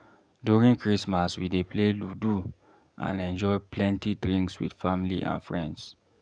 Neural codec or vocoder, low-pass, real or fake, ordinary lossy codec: codec, 44.1 kHz, 7.8 kbps, DAC; 9.9 kHz; fake; none